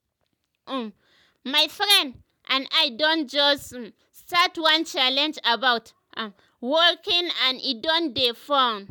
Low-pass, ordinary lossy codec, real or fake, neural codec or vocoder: none; none; real; none